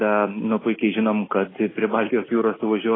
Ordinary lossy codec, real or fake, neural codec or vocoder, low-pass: AAC, 16 kbps; real; none; 7.2 kHz